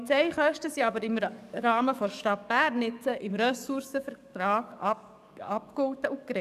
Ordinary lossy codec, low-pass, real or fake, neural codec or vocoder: none; 14.4 kHz; fake; codec, 44.1 kHz, 7.8 kbps, DAC